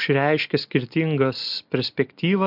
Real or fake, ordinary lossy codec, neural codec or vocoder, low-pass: real; MP3, 48 kbps; none; 5.4 kHz